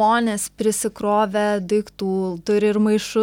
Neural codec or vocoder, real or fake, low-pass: none; real; 19.8 kHz